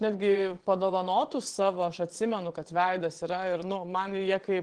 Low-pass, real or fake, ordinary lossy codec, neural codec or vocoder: 9.9 kHz; fake; Opus, 16 kbps; vocoder, 22.05 kHz, 80 mel bands, WaveNeXt